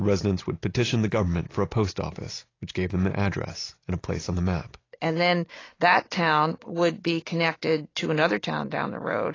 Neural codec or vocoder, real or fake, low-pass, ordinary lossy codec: none; real; 7.2 kHz; AAC, 32 kbps